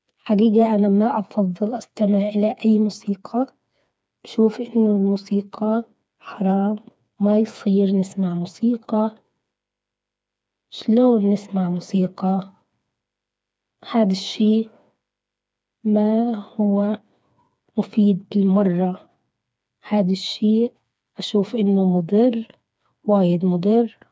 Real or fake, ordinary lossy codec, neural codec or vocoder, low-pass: fake; none; codec, 16 kHz, 4 kbps, FreqCodec, smaller model; none